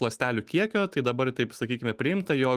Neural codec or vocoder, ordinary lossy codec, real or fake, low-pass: autoencoder, 48 kHz, 128 numbers a frame, DAC-VAE, trained on Japanese speech; Opus, 24 kbps; fake; 14.4 kHz